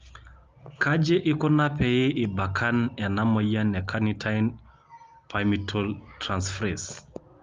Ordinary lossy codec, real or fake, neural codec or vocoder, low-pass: Opus, 32 kbps; real; none; 7.2 kHz